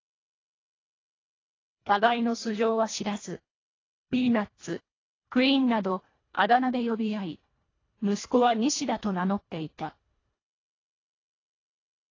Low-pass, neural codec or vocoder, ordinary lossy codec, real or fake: 7.2 kHz; codec, 24 kHz, 1.5 kbps, HILCodec; AAC, 32 kbps; fake